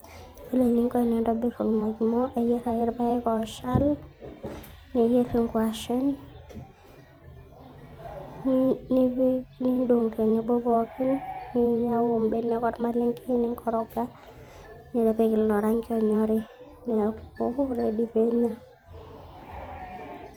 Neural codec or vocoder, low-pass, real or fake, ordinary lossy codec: vocoder, 44.1 kHz, 128 mel bands every 512 samples, BigVGAN v2; none; fake; none